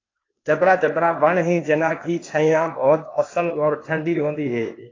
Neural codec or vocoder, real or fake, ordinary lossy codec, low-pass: codec, 16 kHz, 0.8 kbps, ZipCodec; fake; AAC, 32 kbps; 7.2 kHz